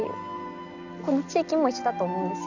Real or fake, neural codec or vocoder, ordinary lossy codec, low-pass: real; none; none; 7.2 kHz